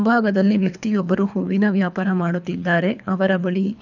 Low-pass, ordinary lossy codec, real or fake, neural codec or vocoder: 7.2 kHz; none; fake; codec, 24 kHz, 3 kbps, HILCodec